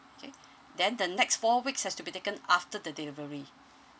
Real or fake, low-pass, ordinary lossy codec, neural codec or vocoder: real; none; none; none